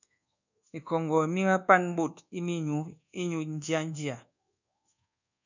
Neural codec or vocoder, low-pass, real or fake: codec, 24 kHz, 1.2 kbps, DualCodec; 7.2 kHz; fake